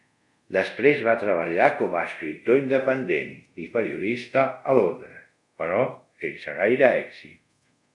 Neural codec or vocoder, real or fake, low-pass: codec, 24 kHz, 0.5 kbps, DualCodec; fake; 10.8 kHz